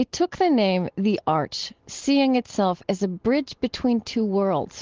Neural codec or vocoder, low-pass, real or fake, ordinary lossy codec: none; 7.2 kHz; real; Opus, 16 kbps